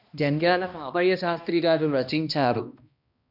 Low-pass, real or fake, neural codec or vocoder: 5.4 kHz; fake; codec, 16 kHz, 1 kbps, X-Codec, HuBERT features, trained on balanced general audio